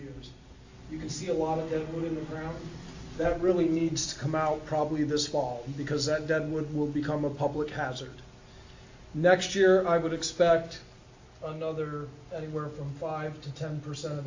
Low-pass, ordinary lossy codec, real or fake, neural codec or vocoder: 7.2 kHz; AAC, 48 kbps; real; none